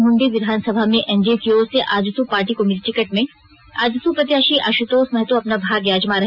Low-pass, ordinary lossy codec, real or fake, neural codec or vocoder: 5.4 kHz; none; real; none